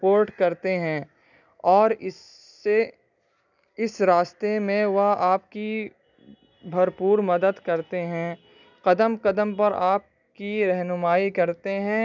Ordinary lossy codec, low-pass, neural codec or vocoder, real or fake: none; 7.2 kHz; none; real